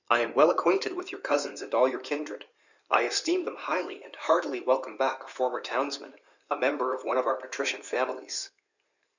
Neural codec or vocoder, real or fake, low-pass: codec, 16 kHz in and 24 kHz out, 2.2 kbps, FireRedTTS-2 codec; fake; 7.2 kHz